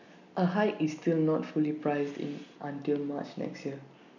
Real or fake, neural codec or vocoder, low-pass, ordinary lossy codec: real; none; 7.2 kHz; none